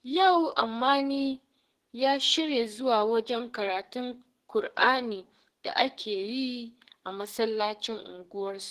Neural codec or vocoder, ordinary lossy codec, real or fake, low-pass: codec, 44.1 kHz, 2.6 kbps, SNAC; Opus, 16 kbps; fake; 14.4 kHz